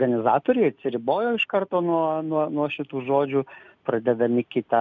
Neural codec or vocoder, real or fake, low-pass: none; real; 7.2 kHz